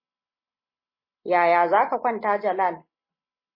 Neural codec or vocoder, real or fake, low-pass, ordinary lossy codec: none; real; 5.4 kHz; MP3, 24 kbps